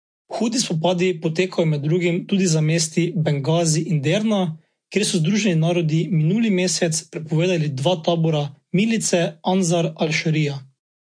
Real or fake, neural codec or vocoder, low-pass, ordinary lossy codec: real; none; 9.9 kHz; MP3, 48 kbps